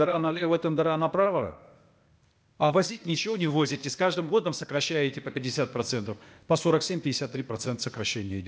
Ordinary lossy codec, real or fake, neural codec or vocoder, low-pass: none; fake; codec, 16 kHz, 0.8 kbps, ZipCodec; none